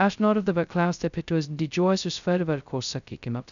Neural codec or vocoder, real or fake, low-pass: codec, 16 kHz, 0.2 kbps, FocalCodec; fake; 7.2 kHz